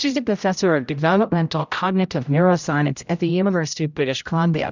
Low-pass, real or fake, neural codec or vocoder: 7.2 kHz; fake; codec, 16 kHz, 0.5 kbps, X-Codec, HuBERT features, trained on general audio